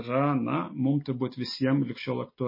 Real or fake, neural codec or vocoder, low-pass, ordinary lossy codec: real; none; 5.4 kHz; MP3, 24 kbps